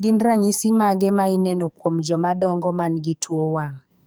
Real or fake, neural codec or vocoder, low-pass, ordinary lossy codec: fake; codec, 44.1 kHz, 2.6 kbps, SNAC; none; none